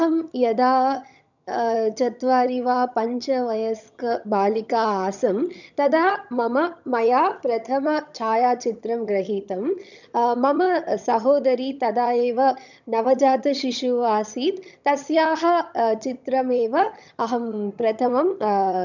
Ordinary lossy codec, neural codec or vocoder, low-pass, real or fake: none; vocoder, 22.05 kHz, 80 mel bands, HiFi-GAN; 7.2 kHz; fake